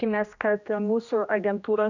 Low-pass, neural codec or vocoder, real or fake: 7.2 kHz; codec, 16 kHz, 1 kbps, X-Codec, HuBERT features, trained on general audio; fake